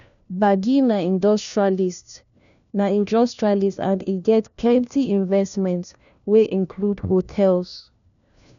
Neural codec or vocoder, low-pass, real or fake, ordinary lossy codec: codec, 16 kHz, 1 kbps, FunCodec, trained on LibriTTS, 50 frames a second; 7.2 kHz; fake; none